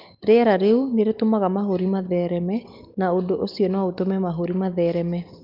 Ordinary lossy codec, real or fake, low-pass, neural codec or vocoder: Opus, 24 kbps; real; 5.4 kHz; none